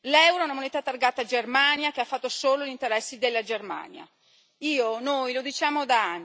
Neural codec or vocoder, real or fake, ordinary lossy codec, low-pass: none; real; none; none